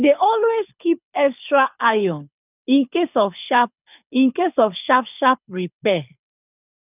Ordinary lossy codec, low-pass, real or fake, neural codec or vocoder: none; 3.6 kHz; fake; codec, 24 kHz, 6 kbps, HILCodec